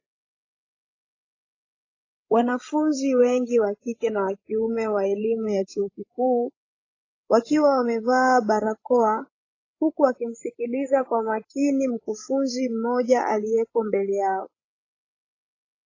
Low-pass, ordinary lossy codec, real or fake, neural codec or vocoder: 7.2 kHz; AAC, 32 kbps; fake; codec, 16 kHz, 16 kbps, FreqCodec, larger model